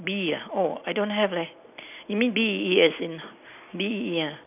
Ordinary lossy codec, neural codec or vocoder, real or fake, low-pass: none; none; real; 3.6 kHz